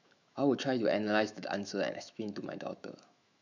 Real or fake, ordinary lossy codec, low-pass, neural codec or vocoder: fake; none; 7.2 kHz; autoencoder, 48 kHz, 128 numbers a frame, DAC-VAE, trained on Japanese speech